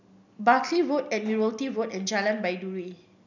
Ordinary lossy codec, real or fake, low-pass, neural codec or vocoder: none; real; 7.2 kHz; none